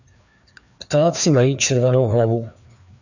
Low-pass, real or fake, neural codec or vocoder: 7.2 kHz; fake; codec, 16 kHz, 2 kbps, FreqCodec, larger model